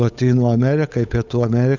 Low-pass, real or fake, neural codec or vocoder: 7.2 kHz; fake; codec, 16 kHz, 8 kbps, FunCodec, trained on Chinese and English, 25 frames a second